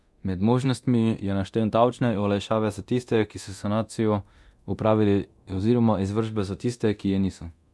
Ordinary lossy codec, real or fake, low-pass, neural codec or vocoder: none; fake; none; codec, 24 kHz, 0.9 kbps, DualCodec